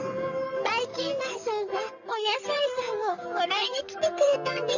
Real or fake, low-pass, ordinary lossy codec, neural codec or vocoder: fake; 7.2 kHz; none; codec, 44.1 kHz, 3.4 kbps, Pupu-Codec